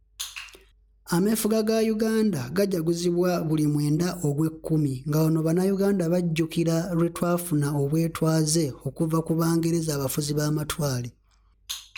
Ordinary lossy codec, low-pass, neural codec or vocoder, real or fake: none; none; none; real